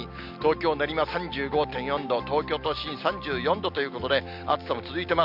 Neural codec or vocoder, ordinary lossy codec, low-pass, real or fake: none; none; 5.4 kHz; real